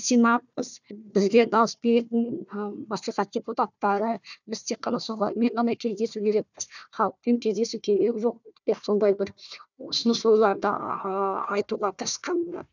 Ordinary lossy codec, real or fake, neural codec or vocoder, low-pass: none; fake; codec, 16 kHz, 1 kbps, FunCodec, trained on Chinese and English, 50 frames a second; 7.2 kHz